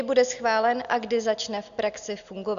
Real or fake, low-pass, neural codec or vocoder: real; 7.2 kHz; none